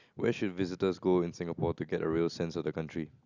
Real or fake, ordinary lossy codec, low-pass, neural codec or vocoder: real; none; 7.2 kHz; none